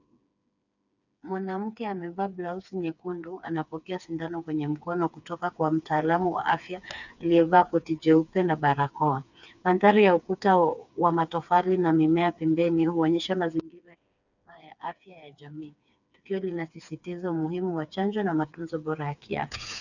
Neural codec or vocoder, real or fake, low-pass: codec, 16 kHz, 4 kbps, FreqCodec, smaller model; fake; 7.2 kHz